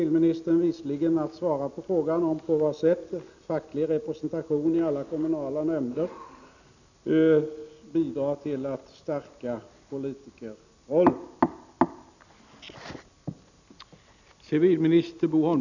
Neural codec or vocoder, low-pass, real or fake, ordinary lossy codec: none; 7.2 kHz; real; none